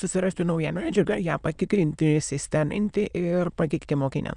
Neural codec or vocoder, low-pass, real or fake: autoencoder, 22.05 kHz, a latent of 192 numbers a frame, VITS, trained on many speakers; 9.9 kHz; fake